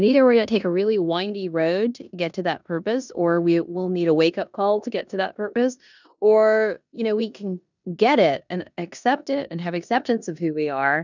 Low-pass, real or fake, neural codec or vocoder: 7.2 kHz; fake; codec, 16 kHz in and 24 kHz out, 0.9 kbps, LongCat-Audio-Codec, four codebook decoder